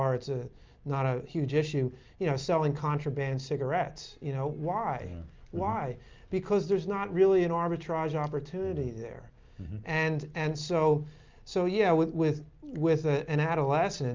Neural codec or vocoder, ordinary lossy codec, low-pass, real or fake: none; Opus, 24 kbps; 7.2 kHz; real